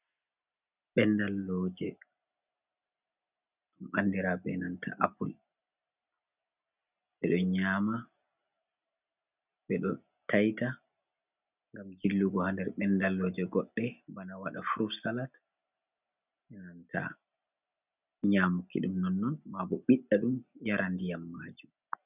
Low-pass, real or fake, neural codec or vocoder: 3.6 kHz; real; none